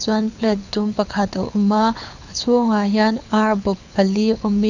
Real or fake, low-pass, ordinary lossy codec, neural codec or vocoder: fake; 7.2 kHz; none; codec, 24 kHz, 6 kbps, HILCodec